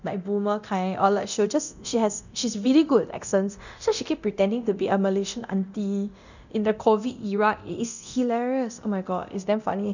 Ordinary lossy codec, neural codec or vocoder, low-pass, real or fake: none; codec, 24 kHz, 0.9 kbps, DualCodec; 7.2 kHz; fake